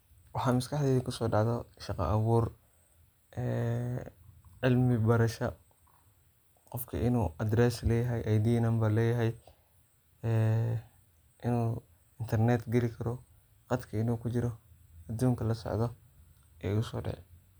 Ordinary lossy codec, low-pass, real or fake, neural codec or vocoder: none; none; real; none